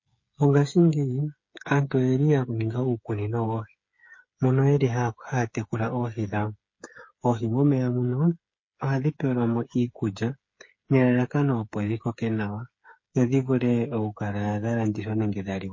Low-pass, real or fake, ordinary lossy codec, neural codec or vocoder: 7.2 kHz; fake; MP3, 32 kbps; codec, 16 kHz, 8 kbps, FreqCodec, smaller model